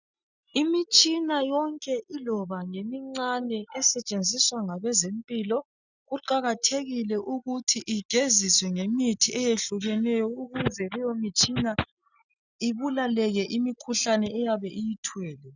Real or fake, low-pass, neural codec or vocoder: real; 7.2 kHz; none